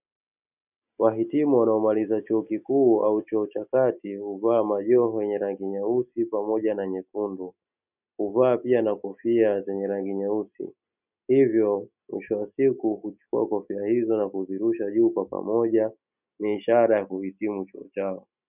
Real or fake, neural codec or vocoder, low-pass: real; none; 3.6 kHz